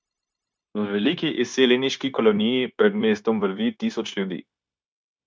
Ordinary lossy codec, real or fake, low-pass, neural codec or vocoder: none; fake; none; codec, 16 kHz, 0.9 kbps, LongCat-Audio-Codec